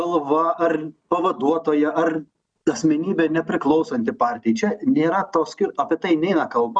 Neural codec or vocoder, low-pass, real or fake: none; 9.9 kHz; real